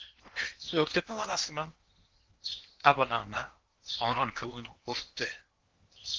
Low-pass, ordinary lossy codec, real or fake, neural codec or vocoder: 7.2 kHz; Opus, 16 kbps; fake; codec, 16 kHz in and 24 kHz out, 0.8 kbps, FocalCodec, streaming, 65536 codes